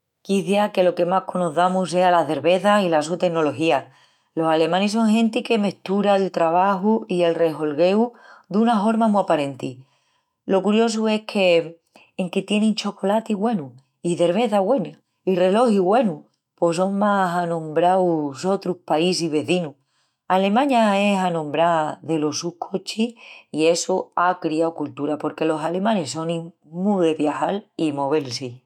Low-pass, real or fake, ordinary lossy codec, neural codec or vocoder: 19.8 kHz; fake; none; autoencoder, 48 kHz, 128 numbers a frame, DAC-VAE, trained on Japanese speech